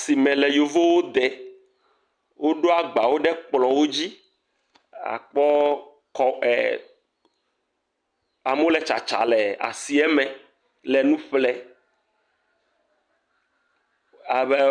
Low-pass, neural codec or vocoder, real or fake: 9.9 kHz; none; real